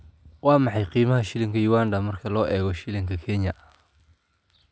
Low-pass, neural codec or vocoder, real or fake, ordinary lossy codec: none; none; real; none